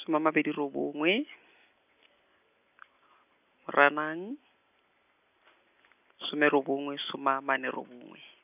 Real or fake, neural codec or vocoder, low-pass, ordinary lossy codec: real; none; 3.6 kHz; none